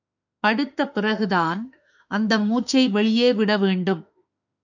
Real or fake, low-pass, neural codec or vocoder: fake; 7.2 kHz; autoencoder, 48 kHz, 32 numbers a frame, DAC-VAE, trained on Japanese speech